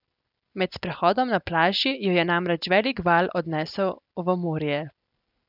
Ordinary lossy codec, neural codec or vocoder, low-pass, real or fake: none; none; 5.4 kHz; real